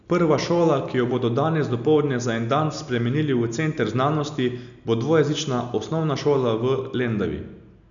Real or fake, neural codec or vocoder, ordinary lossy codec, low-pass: real; none; none; 7.2 kHz